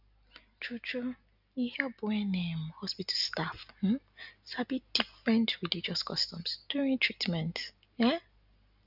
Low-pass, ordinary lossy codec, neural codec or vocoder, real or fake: 5.4 kHz; none; none; real